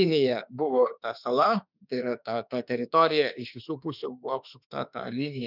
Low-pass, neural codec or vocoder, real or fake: 5.4 kHz; autoencoder, 48 kHz, 32 numbers a frame, DAC-VAE, trained on Japanese speech; fake